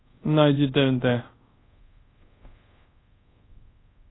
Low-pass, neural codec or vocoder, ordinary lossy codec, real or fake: 7.2 kHz; codec, 24 kHz, 0.5 kbps, DualCodec; AAC, 16 kbps; fake